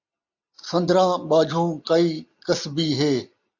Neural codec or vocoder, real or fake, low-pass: none; real; 7.2 kHz